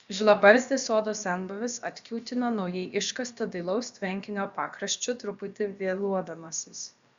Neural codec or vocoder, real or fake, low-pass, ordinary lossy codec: codec, 16 kHz, about 1 kbps, DyCAST, with the encoder's durations; fake; 7.2 kHz; Opus, 64 kbps